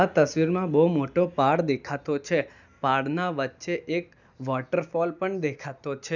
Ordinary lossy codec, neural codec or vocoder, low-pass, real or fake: none; none; 7.2 kHz; real